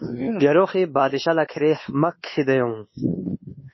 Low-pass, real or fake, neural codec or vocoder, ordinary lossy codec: 7.2 kHz; fake; codec, 24 kHz, 1.2 kbps, DualCodec; MP3, 24 kbps